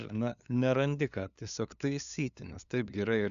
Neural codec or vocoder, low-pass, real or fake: codec, 16 kHz, 2 kbps, FunCodec, trained on LibriTTS, 25 frames a second; 7.2 kHz; fake